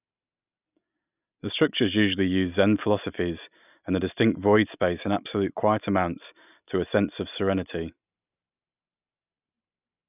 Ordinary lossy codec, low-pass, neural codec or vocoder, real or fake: none; 3.6 kHz; none; real